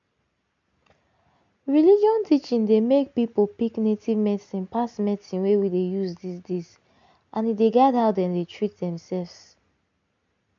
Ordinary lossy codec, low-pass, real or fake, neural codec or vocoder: MP3, 64 kbps; 7.2 kHz; real; none